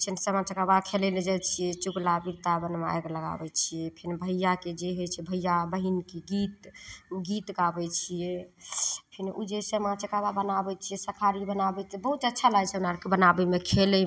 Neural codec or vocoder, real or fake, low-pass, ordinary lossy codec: none; real; none; none